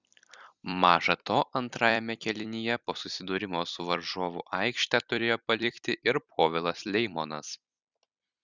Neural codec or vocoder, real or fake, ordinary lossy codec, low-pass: vocoder, 44.1 kHz, 128 mel bands every 256 samples, BigVGAN v2; fake; Opus, 64 kbps; 7.2 kHz